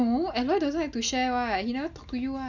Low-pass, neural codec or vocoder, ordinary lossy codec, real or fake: 7.2 kHz; none; none; real